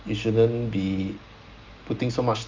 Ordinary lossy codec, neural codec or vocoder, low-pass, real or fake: Opus, 24 kbps; none; 7.2 kHz; real